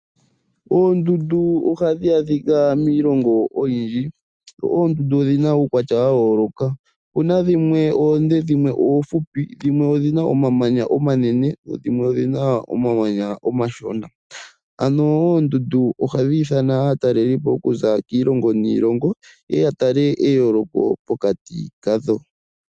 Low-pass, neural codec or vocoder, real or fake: 9.9 kHz; none; real